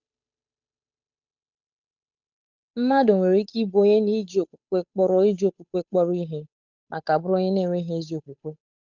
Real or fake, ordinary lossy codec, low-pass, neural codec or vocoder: fake; none; 7.2 kHz; codec, 16 kHz, 8 kbps, FunCodec, trained on Chinese and English, 25 frames a second